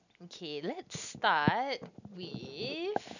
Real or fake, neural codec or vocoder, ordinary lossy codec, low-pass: real; none; none; 7.2 kHz